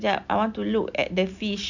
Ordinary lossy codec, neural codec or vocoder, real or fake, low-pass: none; none; real; 7.2 kHz